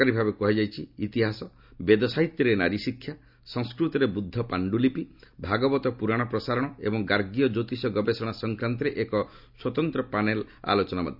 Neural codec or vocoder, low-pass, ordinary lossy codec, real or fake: none; 5.4 kHz; none; real